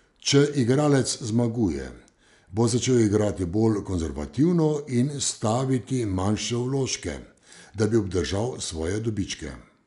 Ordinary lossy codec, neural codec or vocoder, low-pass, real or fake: none; none; 10.8 kHz; real